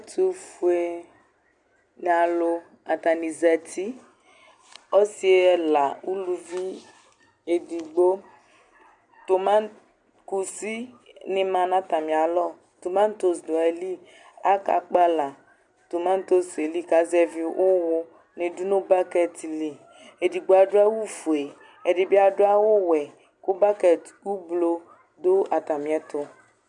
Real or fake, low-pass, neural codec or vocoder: real; 10.8 kHz; none